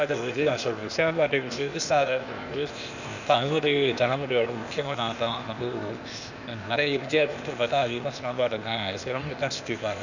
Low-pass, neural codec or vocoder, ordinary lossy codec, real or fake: 7.2 kHz; codec, 16 kHz, 0.8 kbps, ZipCodec; none; fake